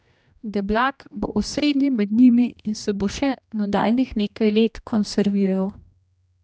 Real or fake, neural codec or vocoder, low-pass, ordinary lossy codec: fake; codec, 16 kHz, 1 kbps, X-Codec, HuBERT features, trained on general audio; none; none